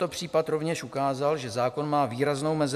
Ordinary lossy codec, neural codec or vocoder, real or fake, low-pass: MP3, 96 kbps; none; real; 14.4 kHz